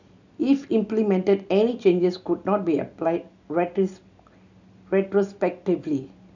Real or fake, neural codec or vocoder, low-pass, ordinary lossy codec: real; none; 7.2 kHz; none